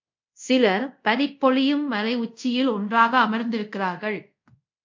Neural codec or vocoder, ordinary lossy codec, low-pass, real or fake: codec, 24 kHz, 0.5 kbps, DualCodec; MP3, 48 kbps; 7.2 kHz; fake